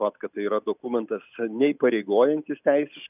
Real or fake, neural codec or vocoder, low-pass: real; none; 3.6 kHz